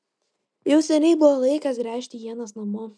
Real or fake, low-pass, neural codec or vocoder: real; 9.9 kHz; none